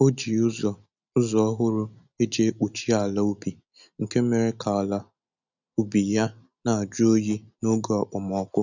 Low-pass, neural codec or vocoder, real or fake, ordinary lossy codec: 7.2 kHz; none; real; none